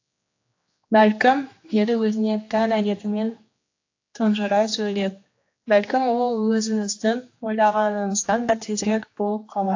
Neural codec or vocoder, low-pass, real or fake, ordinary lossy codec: codec, 16 kHz, 2 kbps, X-Codec, HuBERT features, trained on general audio; 7.2 kHz; fake; AAC, 48 kbps